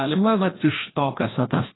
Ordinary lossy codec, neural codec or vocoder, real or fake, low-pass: AAC, 16 kbps; codec, 16 kHz, 1 kbps, FreqCodec, larger model; fake; 7.2 kHz